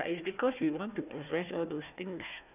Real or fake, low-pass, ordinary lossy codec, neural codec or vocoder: fake; 3.6 kHz; none; codec, 16 kHz, 1 kbps, FunCodec, trained on Chinese and English, 50 frames a second